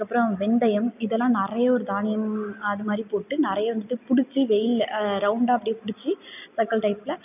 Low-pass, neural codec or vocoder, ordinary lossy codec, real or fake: 3.6 kHz; none; none; real